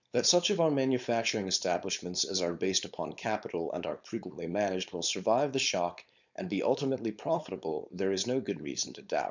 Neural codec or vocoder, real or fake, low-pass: codec, 16 kHz, 4.8 kbps, FACodec; fake; 7.2 kHz